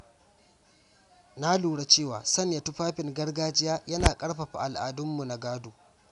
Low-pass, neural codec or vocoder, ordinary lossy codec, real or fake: 10.8 kHz; none; none; real